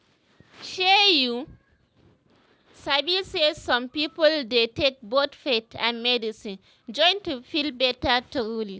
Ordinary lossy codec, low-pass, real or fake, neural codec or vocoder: none; none; real; none